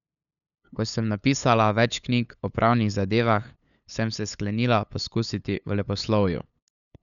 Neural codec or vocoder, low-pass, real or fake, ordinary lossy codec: codec, 16 kHz, 8 kbps, FunCodec, trained on LibriTTS, 25 frames a second; 7.2 kHz; fake; none